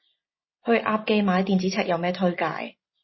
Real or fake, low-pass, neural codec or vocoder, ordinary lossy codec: real; 7.2 kHz; none; MP3, 24 kbps